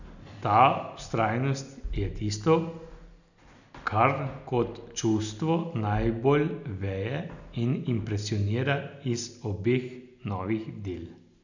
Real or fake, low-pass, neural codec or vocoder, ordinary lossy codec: real; 7.2 kHz; none; none